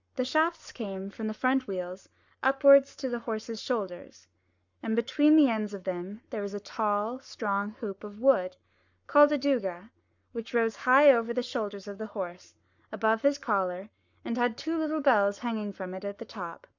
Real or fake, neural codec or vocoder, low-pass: fake; codec, 44.1 kHz, 7.8 kbps, Pupu-Codec; 7.2 kHz